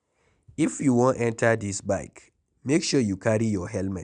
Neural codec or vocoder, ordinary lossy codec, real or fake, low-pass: none; none; real; 9.9 kHz